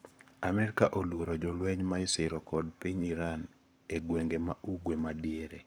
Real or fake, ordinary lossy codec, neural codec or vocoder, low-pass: fake; none; codec, 44.1 kHz, 7.8 kbps, Pupu-Codec; none